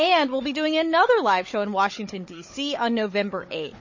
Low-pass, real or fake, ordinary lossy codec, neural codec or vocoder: 7.2 kHz; fake; MP3, 32 kbps; codec, 16 kHz, 16 kbps, FunCodec, trained on Chinese and English, 50 frames a second